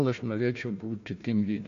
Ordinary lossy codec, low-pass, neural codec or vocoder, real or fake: MP3, 48 kbps; 7.2 kHz; codec, 16 kHz, 1 kbps, FunCodec, trained on Chinese and English, 50 frames a second; fake